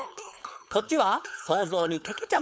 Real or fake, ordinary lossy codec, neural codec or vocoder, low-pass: fake; none; codec, 16 kHz, 4.8 kbps, FACodec; none